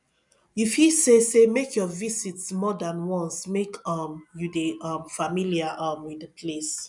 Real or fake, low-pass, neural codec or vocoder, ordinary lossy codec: real; 10.8 kHz; none; none